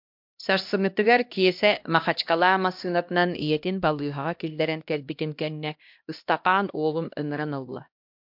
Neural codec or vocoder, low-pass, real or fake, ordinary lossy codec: codec, 16 kHz, 1 kbps, X-Codec, HuBERT features, trained on LibriSpeech; 5.4 kHz; fake; MP3, 48 kbps